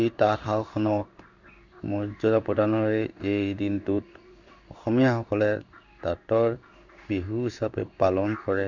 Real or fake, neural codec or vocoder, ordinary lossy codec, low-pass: fake; codec, 16 kHz in and 24 kHz out, 1 kbps, XY-Tokenizer; none; 7.2 kHz